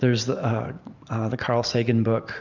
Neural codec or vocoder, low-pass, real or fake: vocoder, 44.1 kHz, 128 mel bands every 512 samples, BigVGAN v2; 7.2 kHz; fake